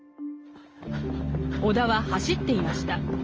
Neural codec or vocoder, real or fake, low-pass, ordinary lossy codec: none; real; 7.2 kHz; Opus, 24 kbps